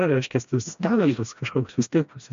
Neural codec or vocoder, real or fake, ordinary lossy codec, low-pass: codec, 16 kHz, 1 kbps, FreqCodec, smaller model; fake; MP3, 64 kbps; 7.2 kHz